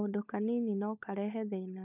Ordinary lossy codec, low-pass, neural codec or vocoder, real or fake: none; 3.6 kHz; codec, 16 kHz, 16 kbps, FunCodec, trained on LibriTTS, 50 frames a second; fake